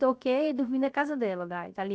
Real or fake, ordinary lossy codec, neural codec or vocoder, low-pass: fake; none; codec, 16 kHz, 0.7 kbps, FocalCodec; none